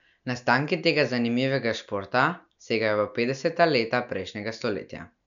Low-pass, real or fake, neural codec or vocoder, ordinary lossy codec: 7.2 kHz; real; none; none